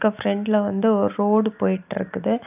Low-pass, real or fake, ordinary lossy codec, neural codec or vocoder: 3.6 kHz; real; none; none